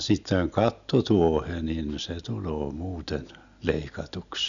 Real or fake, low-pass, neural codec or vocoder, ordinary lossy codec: real; 7.2 kHz; none; none